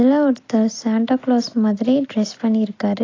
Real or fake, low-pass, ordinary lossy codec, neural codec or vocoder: real; 7.2 kHz; AAC, 32 kbps; none